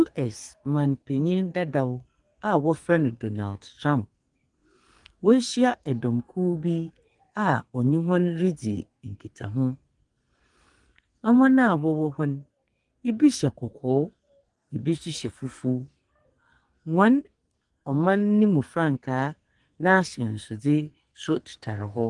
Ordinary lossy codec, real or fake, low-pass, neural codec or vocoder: Opus, 24 kbps; fake; 10.8 kHz; codec, 44.1 kHz, 2.6 kbps, SNAC